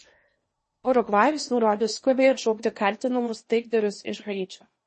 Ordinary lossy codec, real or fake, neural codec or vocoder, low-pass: MP3, 32 kbps; fake; codec, 16 kHz in and 24 kHz out, 0.8 kbps, FocalCodec, streaming, 65536 codes; 10.8 kHz